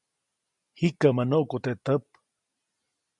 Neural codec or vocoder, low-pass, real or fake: none; 10.8 kHz; real